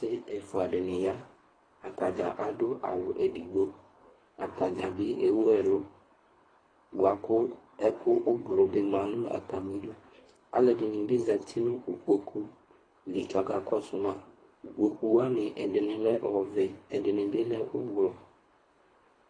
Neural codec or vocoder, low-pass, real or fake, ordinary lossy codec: codec, 24 kHz, 3 kbps, HILCodec; 9.9 kHz; fake; AAC, 32 kbps